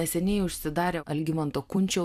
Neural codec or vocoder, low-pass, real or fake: vocoder, 44.1 kHz, 128 mel bands every 256 samples, BigVGAN v2; 14.4 kHz; fake